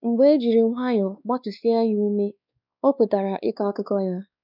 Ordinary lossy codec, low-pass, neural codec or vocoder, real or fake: none; 5.4 kHz; codec, 16 kHz, 2 kbps, X-Codec, WavLM features, trained on Multilingual LibriSpeech; fake